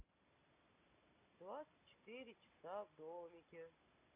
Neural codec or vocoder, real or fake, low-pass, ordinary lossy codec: none; real; 3.6 kHz; none